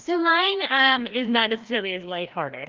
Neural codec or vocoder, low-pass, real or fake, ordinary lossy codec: codec, 16 kHz, 1 kbps, FreqCodec, larger model; 7.2 kHz; fake; Opus, 32 kbps